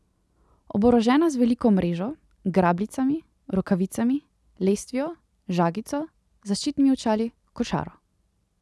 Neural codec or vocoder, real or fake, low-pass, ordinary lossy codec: none; real; none; none